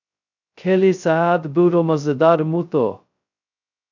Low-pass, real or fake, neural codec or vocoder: 7.2 kHz; fake; codec, 16 kHz, 0.2 kbps, FocalCodec